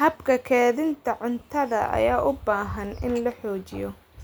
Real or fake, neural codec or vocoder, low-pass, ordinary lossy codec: real; none; none; none